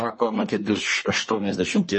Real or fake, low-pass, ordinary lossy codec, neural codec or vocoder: fake; 10.8 kHz; MP3, 32 kbps; codec, 24 kHz, 1 kbps, SNAC